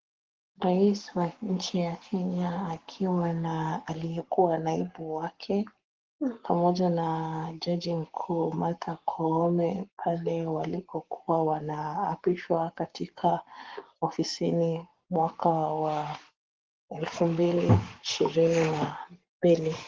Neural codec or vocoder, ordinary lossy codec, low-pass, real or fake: codec, 44.1 kHz, 7.8 kbps, Pupu-Codec; Opus, 16 kbps; 7.2 kHz; fake